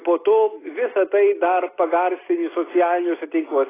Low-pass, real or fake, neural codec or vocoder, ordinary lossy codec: 3.6 kHz; real; none; AAC, 16 kbps